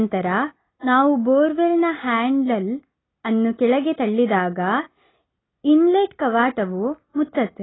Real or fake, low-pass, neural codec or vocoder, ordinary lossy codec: real; 7.2 kHz; none; AAC, 16 kbps